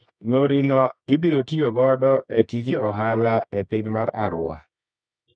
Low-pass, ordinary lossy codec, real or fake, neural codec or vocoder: 9.9 kHz; none; fake; codec, 24 kHz, 0.9 kbps, WavTokenizer, medium music audio release